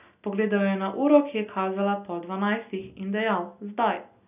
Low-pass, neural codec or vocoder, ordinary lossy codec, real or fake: 3.6 kHz; none; none; real